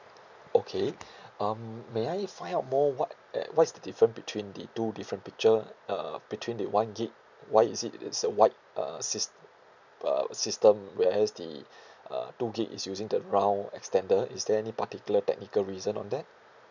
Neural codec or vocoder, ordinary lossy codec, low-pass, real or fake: none; none; 7.2 kHz; real